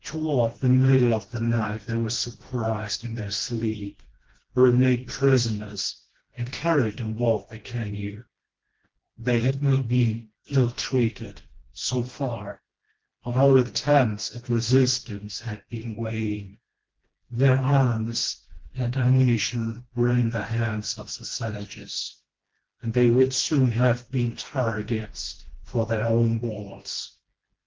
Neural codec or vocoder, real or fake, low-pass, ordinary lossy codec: codec, 16 kHz, 1 kbps, FreqCodec, smaller model; fake; 7.2 kHz; Opus, 16 kbps